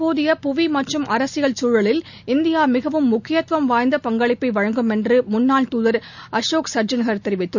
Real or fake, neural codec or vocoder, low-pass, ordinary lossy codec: real; none; 7.2 kHz; none